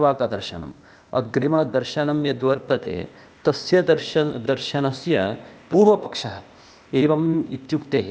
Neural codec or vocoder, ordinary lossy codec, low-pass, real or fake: codec, 16 kHz, 0.8 kbps, ZipCodec; none; none; fake